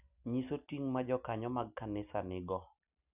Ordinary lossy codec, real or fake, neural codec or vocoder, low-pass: none; real; none; 3.6 kHz